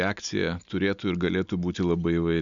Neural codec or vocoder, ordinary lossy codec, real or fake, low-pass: none; MP3, 96 kbps; real; 7.2 kHz